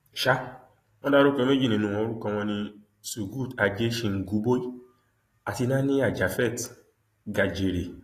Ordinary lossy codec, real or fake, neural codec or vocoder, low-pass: AAC, 48 kbps; real; none; 14.4 kHz